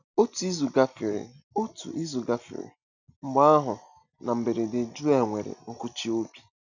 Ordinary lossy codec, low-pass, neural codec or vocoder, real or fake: none; 7.2 kHz; none; real